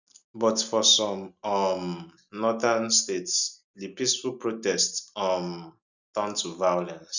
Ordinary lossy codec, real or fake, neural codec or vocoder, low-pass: none; real; none; 7.2 kHz